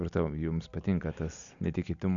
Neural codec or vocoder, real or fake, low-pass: none; real; 7.2 kHz